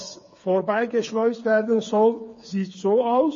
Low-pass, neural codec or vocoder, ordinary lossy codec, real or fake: 7.2 kHz; codec, 16 kHz, 4 kbps, FreqCodec, smaller model; MP3, 32 kbps; fake